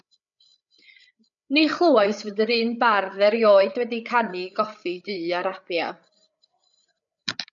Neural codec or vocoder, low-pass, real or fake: codec, 16 kHz, 8 kbps, FreqCodec, larger model; 7.2 kHz; fake